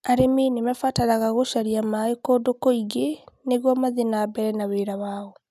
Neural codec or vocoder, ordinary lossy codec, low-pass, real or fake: none; none; 14.4 kHz; real